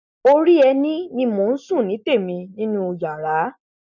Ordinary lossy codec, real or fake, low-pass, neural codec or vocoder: none; real; 7.2 kHz; none